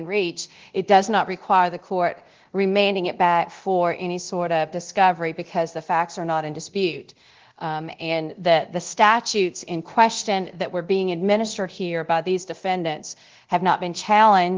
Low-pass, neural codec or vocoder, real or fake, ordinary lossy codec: 7.2 kHz; codec, 24 kHz, 0.9 kbps, DualCodec; fake; Opus, 16 kbps